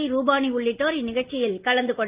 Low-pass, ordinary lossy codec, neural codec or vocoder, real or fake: 3.6 kHz; Opus, 32 kbps; none; real